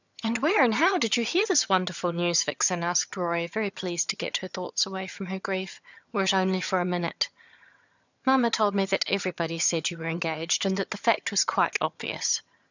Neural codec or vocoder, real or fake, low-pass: vocoder, 22.05 kHz, 80 mel bands, HiFi-GAN; fake; 7.2 kHz